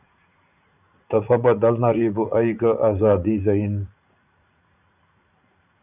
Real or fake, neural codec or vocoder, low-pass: fake; vocoder, 44.1 kHz, 80 mel bands, Vocos; 3.6 kHz